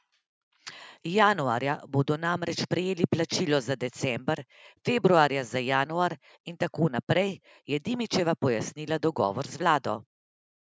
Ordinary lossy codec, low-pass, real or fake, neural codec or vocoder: none; none; real; none